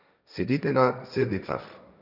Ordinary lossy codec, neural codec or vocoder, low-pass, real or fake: none; codec, 16 kHz, 1.1 kbps, Voila-Tokenizer; 5.4 kHz; fake